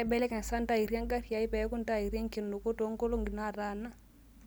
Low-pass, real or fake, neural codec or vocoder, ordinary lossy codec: none; real; none; none